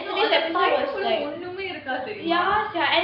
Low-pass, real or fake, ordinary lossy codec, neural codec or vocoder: 5.4 kHz; real; none; none